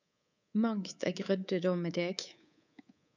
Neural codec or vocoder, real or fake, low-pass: codec, 24 kHz, 3.1 kbps, DualCodec; fake; 7.2 kHz